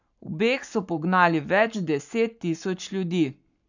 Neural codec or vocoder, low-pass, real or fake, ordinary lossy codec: codec, 44.1 kHz, 7.8 kbps, Pupu-Codec; 7.2 kHz; fake; none